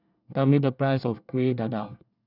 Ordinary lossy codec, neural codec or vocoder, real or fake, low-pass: none; codec, 24 kHz, 1 kbps, SNAC; fake; 5.4 kHz